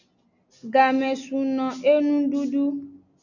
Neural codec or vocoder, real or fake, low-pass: none; real; 7.2 kHz